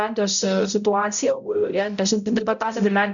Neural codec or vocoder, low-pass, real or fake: codec, 16 kHz, 0.5 kbps, X-Codec, HuBERT features, trained on balanced general audio; 7.2 kHz; fake